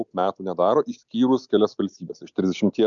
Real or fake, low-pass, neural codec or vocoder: real; 7.2 kHz; none